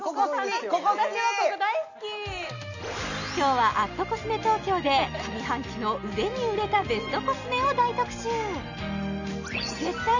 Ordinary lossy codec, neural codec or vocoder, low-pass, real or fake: none; none; 7.2 kHz; real